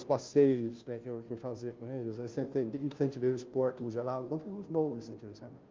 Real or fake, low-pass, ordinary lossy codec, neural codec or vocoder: fake; 7.2 kHz; Opus, 24 kbps; codec, 16 kHz, 0.5 kbps, FunCodec, trained on Chinese and English, 25 frames a second